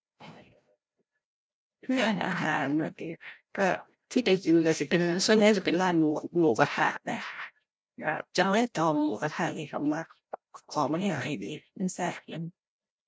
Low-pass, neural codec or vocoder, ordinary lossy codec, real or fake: none; codec, 16 kHz, 0.5 kbps, FreqCodec, larger model; none; fake